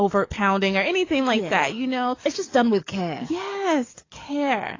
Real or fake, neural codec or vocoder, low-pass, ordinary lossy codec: fake; codec, 44.1 kHz, 7.8 kbps, DAC; 7.2 kHz; AAC, 32 kbps